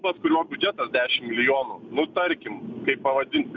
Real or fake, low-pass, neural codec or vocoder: real; 7.2 kHz; none